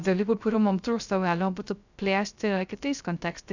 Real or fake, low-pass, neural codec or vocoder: fake; 7.2 kHz; codec, 16 kHz, 0.3 kbps, FocalCodec